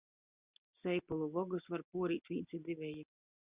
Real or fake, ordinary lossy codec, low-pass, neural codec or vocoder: real; Opus, 64 kbps; 3.6 kHz; none